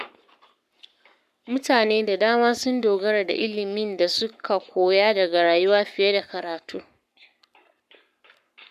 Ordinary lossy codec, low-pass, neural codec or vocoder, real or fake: none; 14.4 kHz; codec, 44.1 kHz, 7.8 kbps, Pupu-Codec; fake